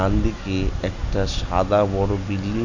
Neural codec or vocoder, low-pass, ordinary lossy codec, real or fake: none; 7.2 kHz; none; real